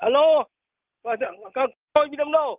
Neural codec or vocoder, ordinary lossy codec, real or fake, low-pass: none; Opus, 32 kbps; real; 3.6 kHz